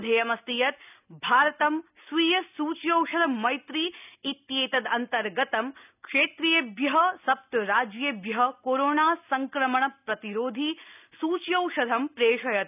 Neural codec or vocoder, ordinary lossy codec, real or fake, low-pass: none; none; real; 3.6 kHz